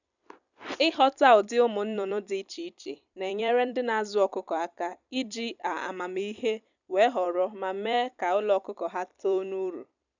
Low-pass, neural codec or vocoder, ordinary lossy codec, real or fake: 7.2 kHz; vocoder, 44.1 kHz, 128 mel bands every 256 samples, BigVGAN v2; none; fake